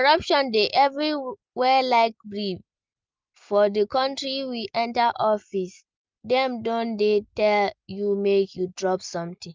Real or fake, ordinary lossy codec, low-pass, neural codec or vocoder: real; Opus, 24 kbps; 7.2 kHz; none